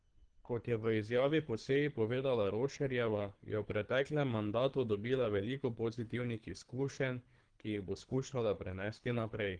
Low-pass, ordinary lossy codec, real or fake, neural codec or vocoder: 9.9 kHz; Opus, 24 kbps; fake; codec, 24 kHz, 3 kbps, HILCodec